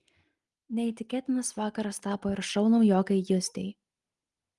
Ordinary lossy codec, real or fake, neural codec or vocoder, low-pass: Opus, 24 kbps; real; none; 10.8 kHz